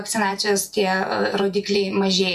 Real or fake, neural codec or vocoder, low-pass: fake; vocoder, 48 kHz, 128 mel bands, Vocos; 14.4 kHz